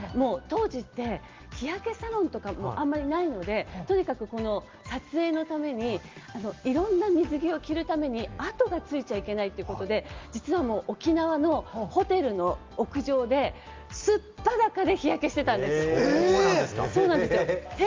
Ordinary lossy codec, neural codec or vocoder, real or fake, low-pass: Opus, 32 kbps; none; real; 7.2 kHz